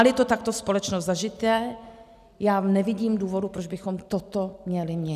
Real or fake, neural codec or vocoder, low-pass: real; none; 14.4 kHz